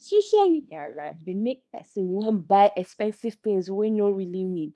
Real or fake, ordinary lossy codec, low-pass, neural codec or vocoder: fake; none; none; codec, 24 kHz, 0.9 kbps, WavTokenizer, small release